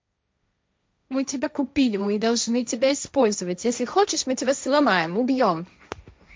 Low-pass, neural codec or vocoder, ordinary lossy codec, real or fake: none; codec, 16 kHz, 1.1 kbps, Voila-Tokenizer; none; fake